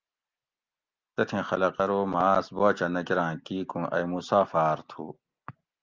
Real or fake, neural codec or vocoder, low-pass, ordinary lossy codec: real; none; 7.2 kHz; Opus, 24 kbps